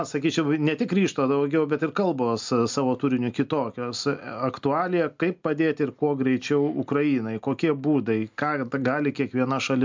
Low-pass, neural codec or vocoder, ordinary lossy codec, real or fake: 7.2 kHz; none; MP3, 64 kbps; real